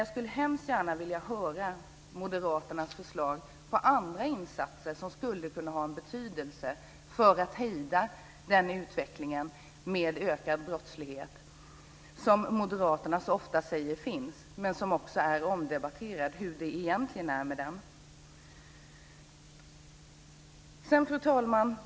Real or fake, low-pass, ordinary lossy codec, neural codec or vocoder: real; none; none; none